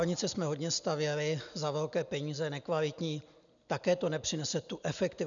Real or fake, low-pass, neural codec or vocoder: real; 7.2 kHz; none